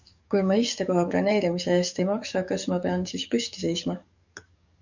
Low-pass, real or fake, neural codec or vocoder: 7.2 kHz; fake; codec, 44.1 kHz, 7.8 kbps, DAC